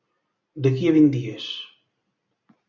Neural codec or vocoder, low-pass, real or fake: none; 7.2 kHz; real